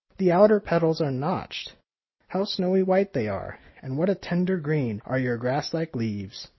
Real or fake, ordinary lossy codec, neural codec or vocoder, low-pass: real; MP3, 24 kbps; none; 7.2 kHz